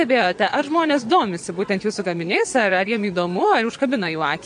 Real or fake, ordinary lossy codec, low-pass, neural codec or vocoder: fake; MP3, 64 kbps; 9.9 kHz; vocoder, 22.05 kHz, 80 mel bands, Vocos